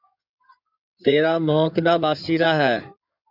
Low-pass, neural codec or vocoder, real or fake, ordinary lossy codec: 5.4 kHz; codec, 16 kHz in and 24 kHz out, 2.2 kbps, FireRedTTS-2 codec; fake; MP3, 48 kbps